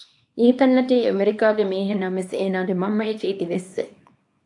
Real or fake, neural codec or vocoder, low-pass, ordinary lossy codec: fake; codec, 24 kHz, 0.9 kbps, WavTokenizer, small release; 10.8 kHz; AAC, 64 kbps